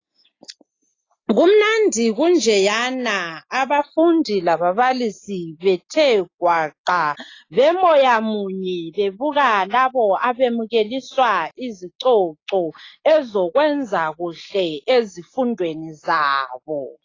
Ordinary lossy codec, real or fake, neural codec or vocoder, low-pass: AAC, 32 kbps; real; none; 7.2 kHz